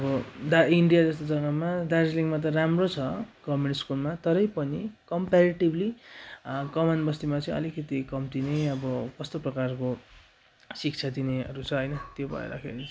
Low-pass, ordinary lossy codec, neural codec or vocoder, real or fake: none; none; none; real